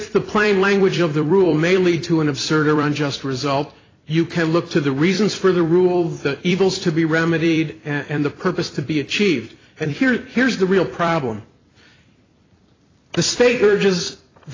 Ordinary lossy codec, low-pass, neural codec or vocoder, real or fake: AAC, 32 kbps; 7.2 kHz; vocoder, 44.1 kHz, 128 mel bands every 256 samples, BigVGAN v2; fake